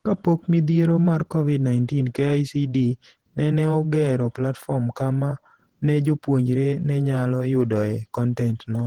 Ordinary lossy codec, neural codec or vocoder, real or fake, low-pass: Opus, 16 kbps; vocoder, 48 kHz, 128 mel bands, Vocos; fake; 19.8 kHz